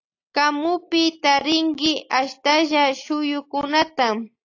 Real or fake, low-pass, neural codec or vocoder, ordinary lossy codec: real; 7.2 kHz; none; AAC, 48 kbps